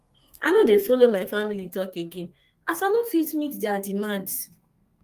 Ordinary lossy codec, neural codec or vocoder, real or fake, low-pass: Opus, 32 kbps; codec, 32 kHz, 1.9 kbps, SNAC; fake; 14.4 kHz